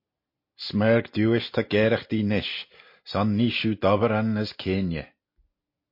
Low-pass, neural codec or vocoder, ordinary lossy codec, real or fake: 5.4 kHz; none; MP3, 32 kbps; real